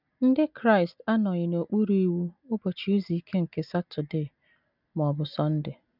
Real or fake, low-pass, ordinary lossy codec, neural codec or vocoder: real; 5.4 kHz; none; none